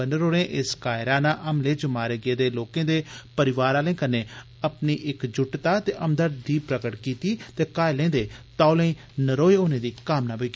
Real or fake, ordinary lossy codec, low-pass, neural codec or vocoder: real; none; none; none